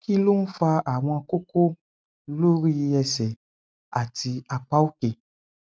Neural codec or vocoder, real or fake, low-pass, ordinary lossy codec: none; real; none; none